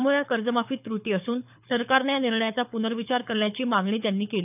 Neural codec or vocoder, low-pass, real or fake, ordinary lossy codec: codec, 24 kHz, 6 kbps, HILCodec; 3.6 kHz; fake; none